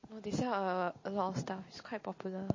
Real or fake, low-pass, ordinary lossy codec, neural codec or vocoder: real; 7.2 kHz; MP3, 32 kbps; none